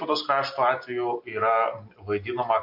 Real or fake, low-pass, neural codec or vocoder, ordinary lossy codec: real; 5.4 kHz; none; MP3, 32 kbps